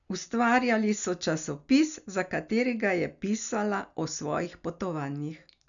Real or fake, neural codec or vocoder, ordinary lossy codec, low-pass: real; none; none; 7.2 kHz